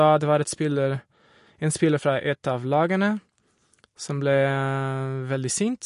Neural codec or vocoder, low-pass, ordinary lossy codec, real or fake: none; 10.8 kHz; MP3, 48 kbps; real